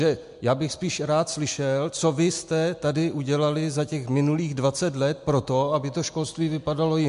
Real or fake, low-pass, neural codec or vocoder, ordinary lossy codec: real; 10.8 kHz; none; MP3, 64 kbps